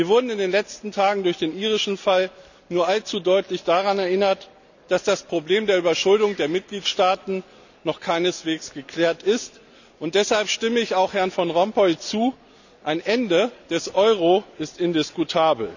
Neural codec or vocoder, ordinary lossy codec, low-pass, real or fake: none; none; 7.2 kHz; real